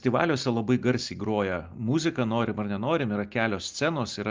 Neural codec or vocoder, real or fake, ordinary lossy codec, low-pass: none; real; Opus, 24 kbps; 7.2 kHz